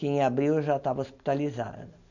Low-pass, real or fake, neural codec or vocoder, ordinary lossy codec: 7.2 kHz; real; none; none